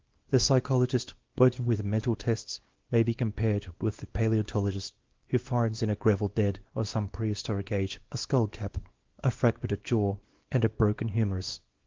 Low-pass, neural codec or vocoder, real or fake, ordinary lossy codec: 7.2 kHz; codec, 24 kHz, 0.9 kbps, WavTokenizer, small release; fake; Opus, 16 kbps